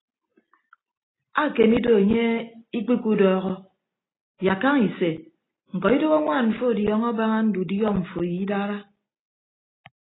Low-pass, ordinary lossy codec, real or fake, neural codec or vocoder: 7.2 kHz; AAC, 16 kbps; real; none